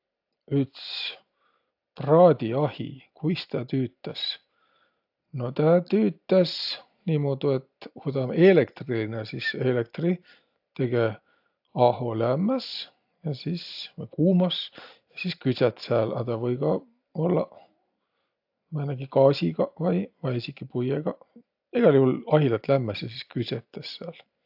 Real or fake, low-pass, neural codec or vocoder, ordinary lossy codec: real; 5.4 kHz; none; AAC, 48 kbps